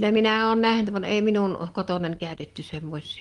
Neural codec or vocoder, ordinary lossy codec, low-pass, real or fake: none; Opus, 24 kbps; 10.8 kHz; real